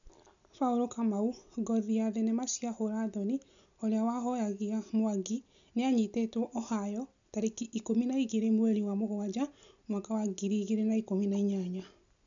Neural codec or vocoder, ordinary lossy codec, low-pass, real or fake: none; none; 7.2 kHz; real